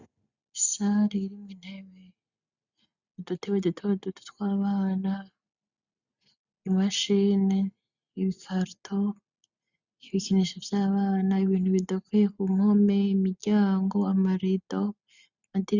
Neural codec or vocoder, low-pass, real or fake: none; 7.2 kHz; real